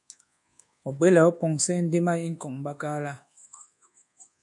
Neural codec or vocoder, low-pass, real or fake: codec, 24 kHz, 1.2 kbps, DualCodec; 10.8 kHz; fake